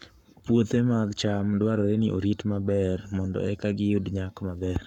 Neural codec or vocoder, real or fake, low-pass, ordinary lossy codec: codec, 44.1 kHz, 7.8 kbps, Pupu-Codec; fake; 19.8 kHz; none